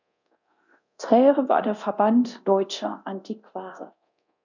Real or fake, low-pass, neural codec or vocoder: fake; 7.2 kHz; codec, 24 kHz, 0.9 kbps, DualCodec